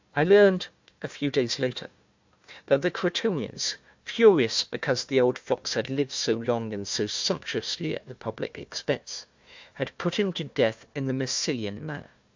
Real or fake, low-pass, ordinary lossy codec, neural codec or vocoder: fake; 7.2 kHz; MP3, 64 kbps; codec, 16 kHz, 1 kbps, FunCodec, trained on Chinese and English, 50 frames a second